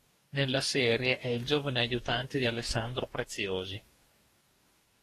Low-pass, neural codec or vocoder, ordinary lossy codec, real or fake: 14.4 kHz; codec, 44.1 kHz, 2.6 kbps, DAC; AAC, 48 kbps; fake